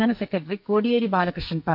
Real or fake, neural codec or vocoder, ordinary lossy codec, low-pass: fake; codec, 44.1 kHz, 2.6 kbps, SNAC; MP3, 48 kbps; 5.4 kHz